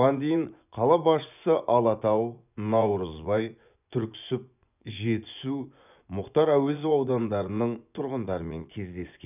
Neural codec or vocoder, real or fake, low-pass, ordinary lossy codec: vocoder, 24 kHz, 100 mel bands, Vocos; fake; 3.6 kHz; none